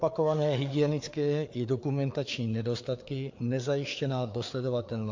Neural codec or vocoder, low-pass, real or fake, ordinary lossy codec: codec, 16 kHz, 4 kbps, FreqCodec, larger model; 7.2 kHz; fake; MP3, 48 kbps